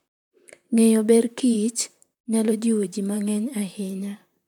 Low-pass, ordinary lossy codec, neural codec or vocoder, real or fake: 19.8 kHz; none; vocoder, 44.1 kHz, 128 mel bands, Pupu-Vocoder; fake